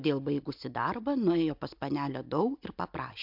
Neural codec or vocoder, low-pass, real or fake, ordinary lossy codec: none; 5.4 kHz; real; MP3, 48 kbps